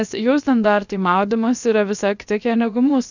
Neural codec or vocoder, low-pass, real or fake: codec, 16 kHz, 0.7 kbps, FocalCodec; 7.2 kHz; fake